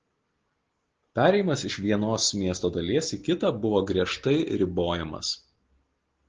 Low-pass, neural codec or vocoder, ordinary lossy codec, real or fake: 7.2 kHz; none; Opus, 16 kbps; real